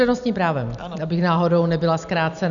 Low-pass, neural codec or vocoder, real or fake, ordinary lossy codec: 7.2 kHz; none; real; MP3, 96 kbps